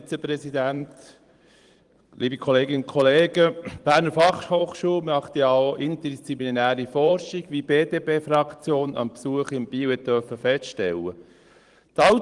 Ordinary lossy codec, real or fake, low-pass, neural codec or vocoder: Opus, 24 kbps; fake; 10.8 kHz; vocoder, 44.1 kHz, 128 mel bands every 512 samples, BigVGAN v2